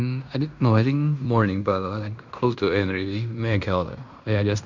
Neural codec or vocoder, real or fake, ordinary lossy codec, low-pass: codec, 16 kHz in and 24 kHz out, 0.9 kbps, LongCat-Audio-Codec, fine tuned four codebook decoder; fake; none; 7.2 kHz